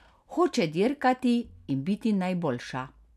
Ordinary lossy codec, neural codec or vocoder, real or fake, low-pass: none; none; real; 14.4 kHz